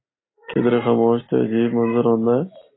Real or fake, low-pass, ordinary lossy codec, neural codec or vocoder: real; 7.2 kHz; AAC, 16 kbps; none